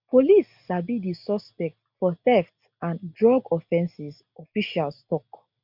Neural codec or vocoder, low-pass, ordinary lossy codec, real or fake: none; 5.4 kHz; MP3, 48 kbps; real